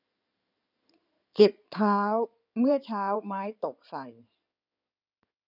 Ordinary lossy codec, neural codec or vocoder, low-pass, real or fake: none; codec, 16 kHz in and 24 kHz out, 2.2 kbps, FireRedTTS-2 codec; 5.4 kHz; fake